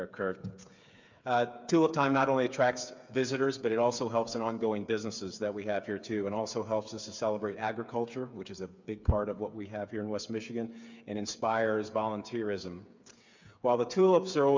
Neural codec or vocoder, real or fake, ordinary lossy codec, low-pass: codec, 16 kHz, 8 kbps, FreqCodec, smaller model; fake; MP3, 64 kbps; 7.2 kHz